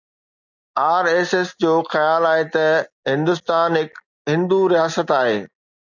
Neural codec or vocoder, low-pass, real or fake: none; 7.2 kHz; real